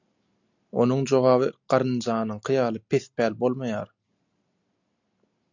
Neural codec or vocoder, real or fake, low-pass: none; real; 7.2 kHz